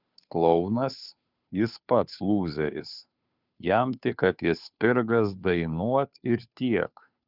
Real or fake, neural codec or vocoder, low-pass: fake; codec, 16 kHz, 2 kbps, FunCodec, trained on Chinese and English, 25 frames a second; 5.4 kHz